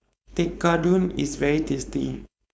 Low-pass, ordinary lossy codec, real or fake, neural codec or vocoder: none; none; fake; codec, 16 kHz, 4.8 kbps, FACodec